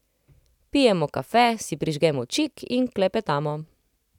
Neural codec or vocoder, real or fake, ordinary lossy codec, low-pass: none; real; none; 19.8 kHz